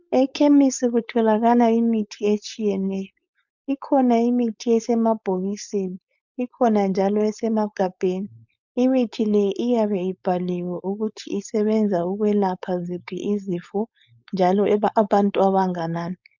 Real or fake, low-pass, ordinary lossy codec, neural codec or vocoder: fake; 7.2 kHz; MP3, 64 kbps; codec, 16 kHz, 4.8 kbps, FACodec